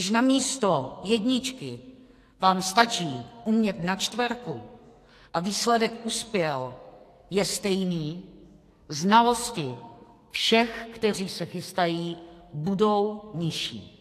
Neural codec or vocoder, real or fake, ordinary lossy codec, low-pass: codec, 44.1 kHz, 2.6 kbps, SNAC; fake; AAC, 64 kbps; 14.4 kHz